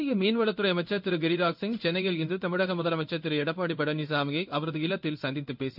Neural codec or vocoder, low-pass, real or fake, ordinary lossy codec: codec, 16 kHz in and 24 kHz out, 1 kbps, XY-Tokenizer; 5.4 kHz; fake; none